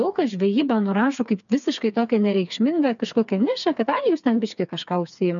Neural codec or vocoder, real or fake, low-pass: codec, 16 kHz, 4 kbps, FreqCodec, smaller model; fake; 7.2 kHz